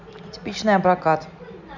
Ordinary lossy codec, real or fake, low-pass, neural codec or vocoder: none; real; 7.2 kHz; none